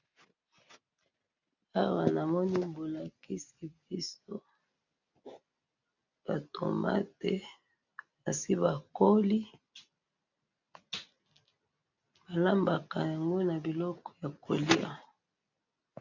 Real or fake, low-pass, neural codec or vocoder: real; 7.2 kHz; none